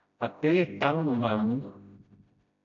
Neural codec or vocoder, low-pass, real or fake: codec, 16 kHz, 0.5 kbps, FreqCodec, smaller model; 7.2 kHz; fake